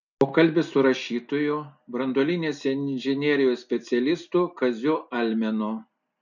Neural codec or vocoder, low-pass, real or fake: none; 7.2 kHz; real